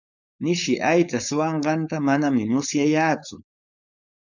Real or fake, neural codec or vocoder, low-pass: fake; codec, 16 kHz, 4.8 kbps, FACodec; 7.2 kHz